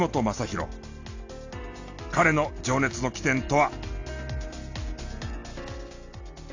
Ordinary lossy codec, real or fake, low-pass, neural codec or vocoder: AAC, 48 kbps; real; 7.2 kHz; none